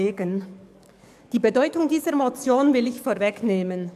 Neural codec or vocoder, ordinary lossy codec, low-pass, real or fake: codec, 44.1 kHz, 7.8 kbps, DAC; none; 14.4 kHz; fake